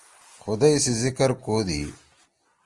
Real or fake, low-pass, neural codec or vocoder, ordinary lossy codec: real; 10.8 kHz; none; Opus, 24 kbps